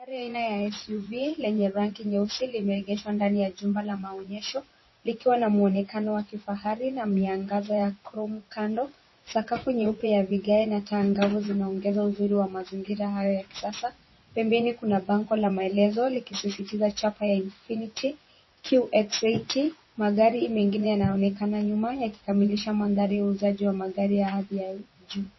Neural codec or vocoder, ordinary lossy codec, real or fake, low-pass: vocoder, 24 kHz, 100 mel bands, Vocos; MP3, 24 kbps; fake; 7.2 kHz